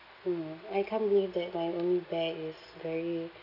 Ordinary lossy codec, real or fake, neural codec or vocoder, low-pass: none; real; none; 5.4 kHz